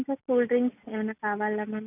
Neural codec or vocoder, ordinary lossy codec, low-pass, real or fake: none; none; 3.6 kHz; real